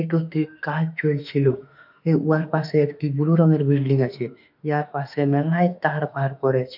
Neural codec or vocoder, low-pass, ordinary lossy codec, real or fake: autoencoder, 48 kHz, 32 numbers a frame, DAC-VAE, trained on Japanese speech; 5.4 kHz; none; fake